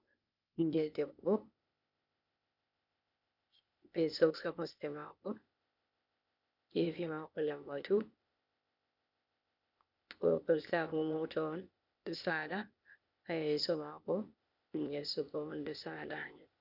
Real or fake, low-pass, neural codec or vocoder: fake; 5.4 kHz; codec, 16 kHz, 0.8 kbps, ZipCodec